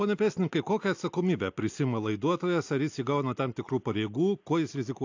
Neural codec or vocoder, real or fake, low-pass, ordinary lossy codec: none; real; 7.2 kHz; AAC, 48 kbps